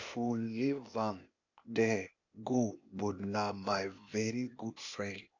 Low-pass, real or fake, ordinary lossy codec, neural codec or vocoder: 7.2 kHz; fake; AAC, 48 kbps; codec, 16 kHz, 0.8 kbps, ZipCodec